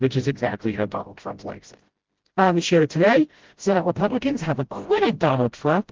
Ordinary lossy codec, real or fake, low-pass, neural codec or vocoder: Opus, 16 kbps; fake; 7.2 kHz; codec, 16 kHz, 0.5 kbps, FreqCodec, smaller model